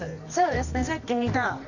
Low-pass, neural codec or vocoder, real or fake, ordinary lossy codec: 7.2 kHz; codec, 24 kHz, 0.9 kbps, WavTokenizer, medium music audio release; fake; none